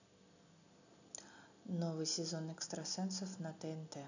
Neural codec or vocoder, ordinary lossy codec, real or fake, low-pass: none; none; real; 7.2 kHz